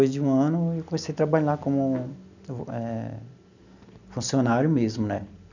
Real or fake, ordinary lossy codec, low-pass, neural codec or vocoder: real; none; 7.2 kHz; none